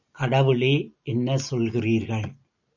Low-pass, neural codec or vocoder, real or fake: 7.2 kHz; none; real